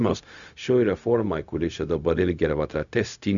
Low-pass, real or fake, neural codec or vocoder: 7.2 kHz; fake; codec, 16 kHz, 0.4 kbps, LongCat-Audio-Codec